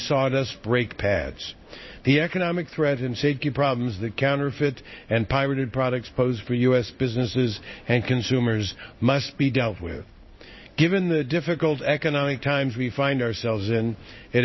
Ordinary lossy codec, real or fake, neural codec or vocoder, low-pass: MP3, 24 kbps; fake; codec, 16 kHz in and 24 kHz out, 1 kbps, XY-Tokenizer; 7.2 kHz